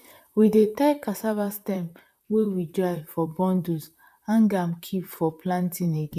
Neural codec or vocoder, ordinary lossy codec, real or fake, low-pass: vocoder, 44.1 kHz, 128 mel bands, Pupu-Vocoder; none; fake; 14.4 kHz